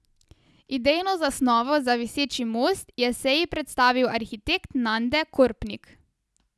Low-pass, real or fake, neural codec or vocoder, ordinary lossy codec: none; real; none; none